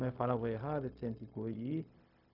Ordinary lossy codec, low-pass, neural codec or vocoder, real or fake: none; 5.4 kHz; codec, 16 kHz, 0.4 kbps, LongCat-Audio-Codec; fake